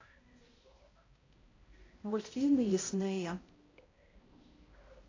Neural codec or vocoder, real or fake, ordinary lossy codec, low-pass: codec, 16 kHz, 0.5 kbps, X-Codec, HuBERT features, trained on balanced general audio; fake; AAC, 32 kbps; 7.2 kHz